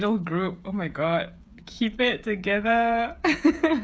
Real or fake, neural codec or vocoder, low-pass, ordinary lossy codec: fake; codec, 16 kHz, 8 kbps, FreqCodec, smaller model; none; none